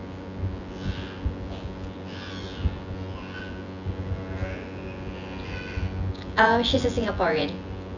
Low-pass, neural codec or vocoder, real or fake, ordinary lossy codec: 7.2 kHz; vocoder, 24 kHz, 100 mel bands, Vocos; fake; none